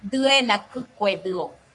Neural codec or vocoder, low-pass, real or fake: codec, 44.1 kHz, 3.4 kbps, Pupu-Codec; 10.8 kHz; fake